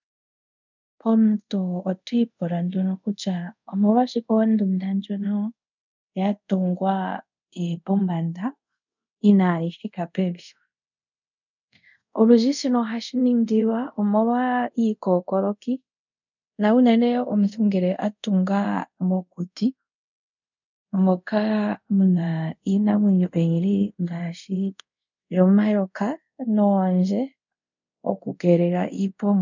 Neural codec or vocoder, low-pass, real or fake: codec, 24 kHz, 0.5 kbps, DualCodec; 7.2 kHz; fake